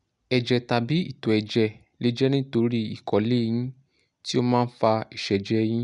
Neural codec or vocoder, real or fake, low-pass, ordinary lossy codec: none; real; 10.8 kHz; none